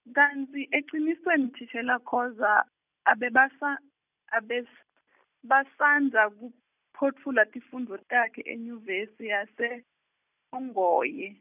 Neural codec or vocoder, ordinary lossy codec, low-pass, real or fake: none; none; 3.6 kHz; real